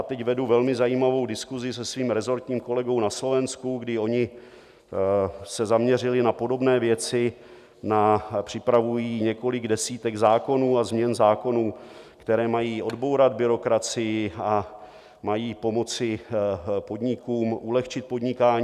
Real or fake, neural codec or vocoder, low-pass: real; none; 14.4 kHz